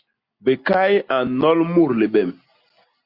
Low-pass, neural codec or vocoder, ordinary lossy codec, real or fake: 5.4 kHz; none; Opus, 64 kbps; real